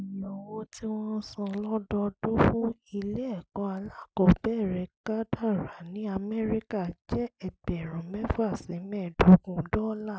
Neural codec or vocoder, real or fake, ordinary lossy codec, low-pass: none; real; none; none